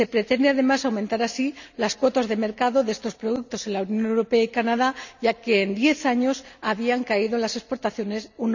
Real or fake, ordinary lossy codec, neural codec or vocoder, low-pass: real; none; none; 7.2 kHz